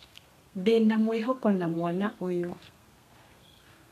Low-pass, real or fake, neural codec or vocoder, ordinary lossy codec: 14.4 kHz; fake; codec, 32 kHz, 1.9 kbps, SNAC; none